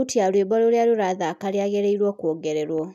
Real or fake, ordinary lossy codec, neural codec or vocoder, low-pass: real; none; none; 14.4 kHz